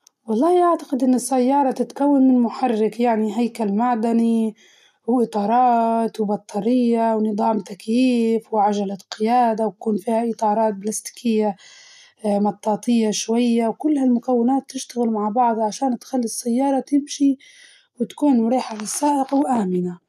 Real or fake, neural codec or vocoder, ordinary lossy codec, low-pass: real; none; none; 14.4 kHz